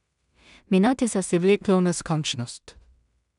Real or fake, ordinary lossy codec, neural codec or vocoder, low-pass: fake; none; codec, 16 kHz in and 24 kHz out, 0.4 kbps, LongCat-Audio-Codec, two codebook decoder; 10.8 kHz